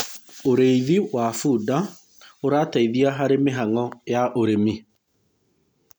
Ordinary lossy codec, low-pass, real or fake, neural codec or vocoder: none; none; real; none